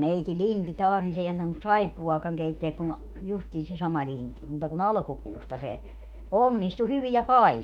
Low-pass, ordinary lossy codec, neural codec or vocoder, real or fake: 19.8 kHz; none; autoencoder, 48 kHz, 32 numbers a frame, DAC-VAE, trained on Japanese speech; fake